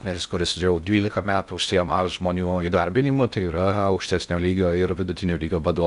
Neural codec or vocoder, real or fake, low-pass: codec, 16 kHz in and 24 kHz out, 0.6 kbps, FocalCodec, streaming, 4096 codes; fake; 10.8 kHz